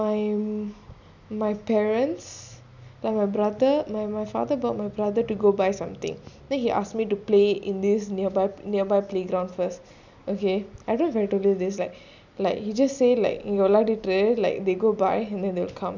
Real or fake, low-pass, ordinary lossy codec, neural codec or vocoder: real; 7.2 kHz; none; none